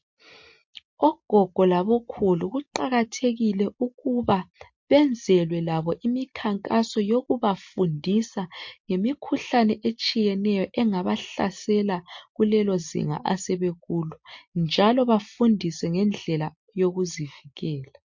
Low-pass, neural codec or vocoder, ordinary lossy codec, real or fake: 7.2 kHz; none; MP3, 48 kbps; real